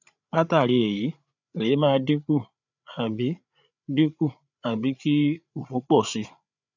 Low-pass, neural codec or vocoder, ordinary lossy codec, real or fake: 7.2 kHz; codec, 16 kHz, 8 kbps, FreqCodec, larger model; none; fake